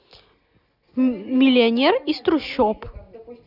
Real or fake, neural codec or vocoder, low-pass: real; none; 5.4 kHz